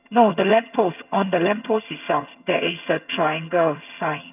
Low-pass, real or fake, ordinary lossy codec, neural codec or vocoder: 3.6 kHz; fake; none; vocoder, 22.05 kHz, 80 mel bands, HiFi-GAN